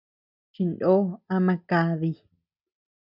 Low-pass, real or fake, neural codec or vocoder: 5.4 kHz; real; none